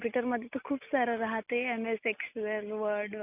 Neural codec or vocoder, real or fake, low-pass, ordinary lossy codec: none; real; 3.6 kHz; none